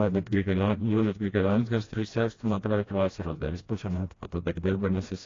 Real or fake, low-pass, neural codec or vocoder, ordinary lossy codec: fake; 7.2 kHz; codec, 16 kHz, 1 kbps, FreqCodec, smaller model; AAC, 32 kbps